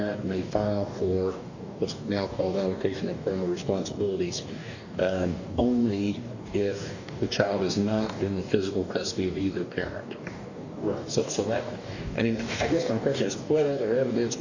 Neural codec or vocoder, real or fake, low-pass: codec, 44.1 kHz, 2.6 kbps, DAC; fake; 7.2 kHz